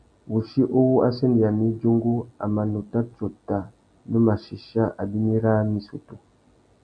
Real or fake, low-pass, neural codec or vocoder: real; 9.9 kHz; none